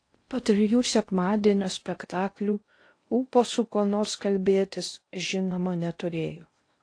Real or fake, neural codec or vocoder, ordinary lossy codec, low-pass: fake; codec, 16 kHz in and 24 kHz out, 0.6 kbps, FocalCodec, streaming, 4096 codes; AAC, 48 kbps; 9.9 kHz